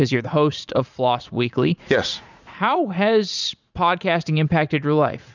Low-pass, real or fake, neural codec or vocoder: 7.2 kHz; fake; vocoder, 44.1 kHz, 80 mel bands, Vocos